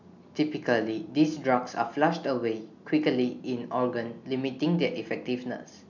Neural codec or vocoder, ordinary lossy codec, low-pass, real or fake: none; none; 7.2 kHz; real